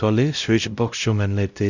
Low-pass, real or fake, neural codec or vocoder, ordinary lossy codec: 7.2 kHz; fake; codec, 16 kHz, 0.5 kbps, X-Codec, WavLM features, trained on Multilingual LibriSpeech; none